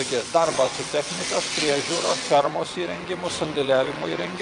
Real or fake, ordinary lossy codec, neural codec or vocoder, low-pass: fake; AAC, 64 kbps; vocoder, 22.05 kHz, 80 mel bands, WaveNeXt; 9.9 kHz